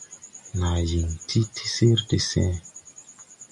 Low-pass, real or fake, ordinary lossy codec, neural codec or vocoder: 10.8 kHz; real; MP3, 96 kbps; none